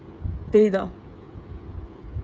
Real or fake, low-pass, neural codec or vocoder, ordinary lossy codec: fake; none; codec, 16 kHz, 8 kbps, FreqCodec, smaller model; none